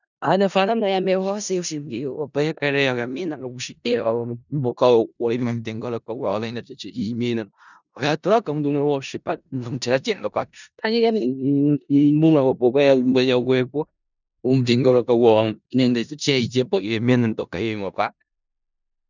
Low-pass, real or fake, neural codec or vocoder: 7.2 kHz; fake; codec, 16 kHz in and 24 kHz out, 0.4 kbps, LongCat-Audio-Codec, four codebook decoder